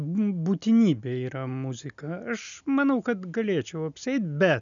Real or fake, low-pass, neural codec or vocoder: real; 7.2 kHz; none